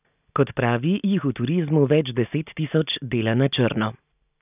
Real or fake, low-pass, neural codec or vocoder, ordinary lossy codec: real; 3.6 kHz; none; none